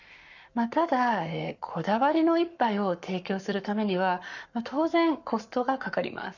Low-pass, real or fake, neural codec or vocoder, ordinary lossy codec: 7.2 kHz; fake; codec, 44.1 kHz, 7.8 kbps, Pupu-Codec; none